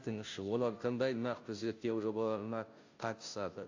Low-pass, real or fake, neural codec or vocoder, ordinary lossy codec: 7.2 kHz; fake; codec, 16 kHz, 0.5 kbps, FunCodec, trained on Chinese and English, 25 frames a second; AAC, 48 kbps